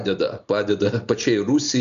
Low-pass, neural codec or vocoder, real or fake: 7.2 kHz; none; real